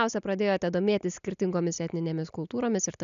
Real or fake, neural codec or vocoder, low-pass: real; none; 7.2 kHz